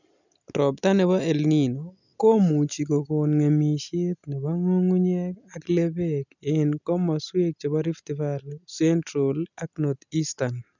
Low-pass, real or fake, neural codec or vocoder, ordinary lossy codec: 7.2 kHz; real; none; none